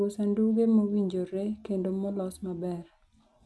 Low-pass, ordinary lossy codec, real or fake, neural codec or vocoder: none; none; real; none